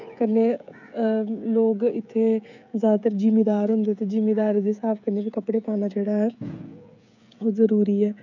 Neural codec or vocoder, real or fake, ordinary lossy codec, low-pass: codec, 16 kHz, 16 kbps, FreqCodec, smaller model; fake; none; 7.2 kHz